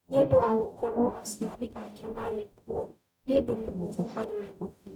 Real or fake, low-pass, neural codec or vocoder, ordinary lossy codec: fake; 19.8 kHz; codec, 44.1 kHz, 0.9 kbps, DAC; none